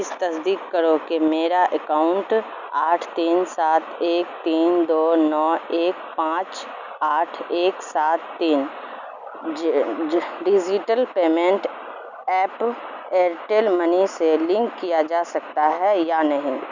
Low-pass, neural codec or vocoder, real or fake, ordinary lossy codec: 7.2 kHz; none; real; none